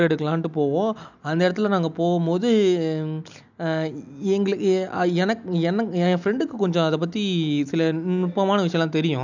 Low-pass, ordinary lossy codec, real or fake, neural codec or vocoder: 7.2 kHz; none; real; none